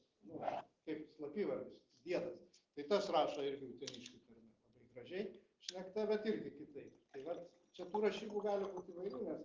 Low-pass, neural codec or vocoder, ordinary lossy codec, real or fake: 7.2 kHz; none; Opus, 16 kbps; real